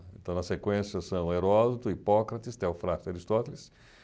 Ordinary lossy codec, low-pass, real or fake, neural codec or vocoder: none; none; real; none